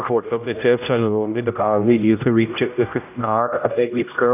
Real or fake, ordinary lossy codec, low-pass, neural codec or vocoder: fake; none; 3.6 kHz; codec, 16 kHz, 0.5 kbps, X-Codec, HuBERT features, trained on general audio